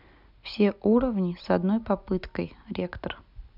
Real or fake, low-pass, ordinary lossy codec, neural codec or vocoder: real; 5.4 kHz; none; none